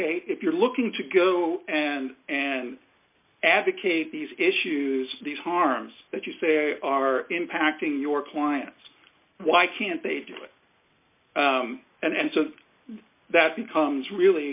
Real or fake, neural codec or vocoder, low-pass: real; none; 3.6 kHz